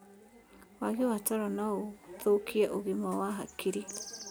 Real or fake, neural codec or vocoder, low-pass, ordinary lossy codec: real; none; none; none